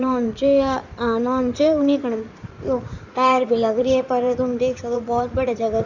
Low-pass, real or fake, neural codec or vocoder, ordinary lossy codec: 7.2 kHz; fake; codec, 16 kHz in and 24 kHz out, 2.2 kbps, FireRedTTS-2 codec; none